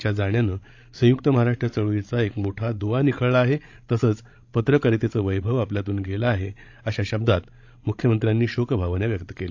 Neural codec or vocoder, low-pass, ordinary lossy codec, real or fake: codec, 16 kHz, 16 kbps, FreqCodec, larger model; 7.2 kHz; AAC, 48 kbps; fake